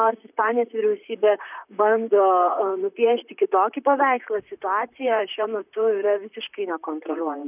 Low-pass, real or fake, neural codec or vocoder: 3.6 kHz; fake; vocoder, 44.1 kHz, 128 mel bands, Pupu-Vocoder